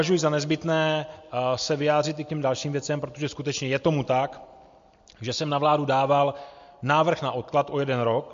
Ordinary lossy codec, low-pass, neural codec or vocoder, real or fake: MP3, 48 kbps; 7.2 kHz; none; real